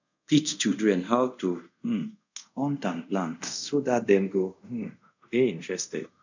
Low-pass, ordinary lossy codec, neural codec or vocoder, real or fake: 7.2 kHz; none; codec, 24 kHz, 0.5 kbps, DualCodec; fake